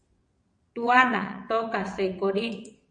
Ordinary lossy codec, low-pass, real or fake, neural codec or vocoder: MP3, 48 kbps; 9.9 kHz; fake; vocoder, 22.05 kHz, 80 mel bands, WaveNeXt